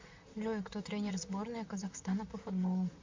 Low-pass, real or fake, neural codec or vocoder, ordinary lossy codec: 7.2 kHz; fake; vocoder, 44.1 kHz, 128 mel bands, Pupu-Vocoder; MP3, 64 kbps